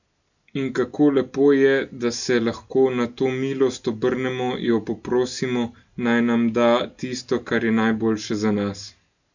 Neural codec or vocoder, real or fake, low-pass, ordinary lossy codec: none; real; 7.2 kHz; none